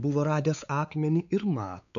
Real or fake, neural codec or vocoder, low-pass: fake; codec, 16 kHz, 4 kbps, X-Codec, WavLM features, trained on Multilingual LibriSpeech; 7.2 kHz